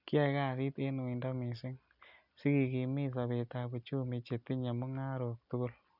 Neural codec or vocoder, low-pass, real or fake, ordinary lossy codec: none; 5.4 kHz; real; none